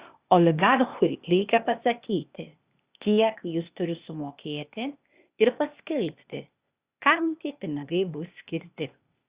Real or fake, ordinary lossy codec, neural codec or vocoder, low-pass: fake; Opus, 64 kbps; codec, 16 kHz, 0.8 kbps, ZipCodec; 3.6 kHz